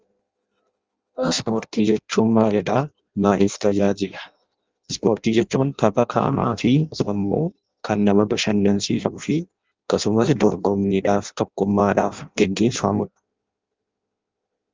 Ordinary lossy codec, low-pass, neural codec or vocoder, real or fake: Opus, 24 kbps; 7.2 kHz; codec, 16 kHz in and 24 kHz out, 0.6 kbps, FireRedTTS-2 codec; fake